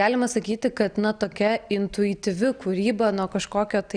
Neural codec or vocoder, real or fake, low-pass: none; real; 9.9 kHz